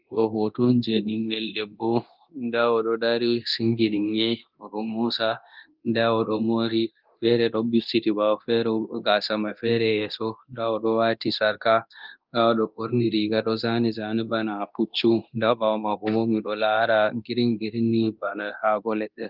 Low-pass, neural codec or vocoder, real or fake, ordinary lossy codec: 5.4 kHz; codec, 24 kHz, 0.9 kbps, DualCodec; fake; Opus, 32 kbps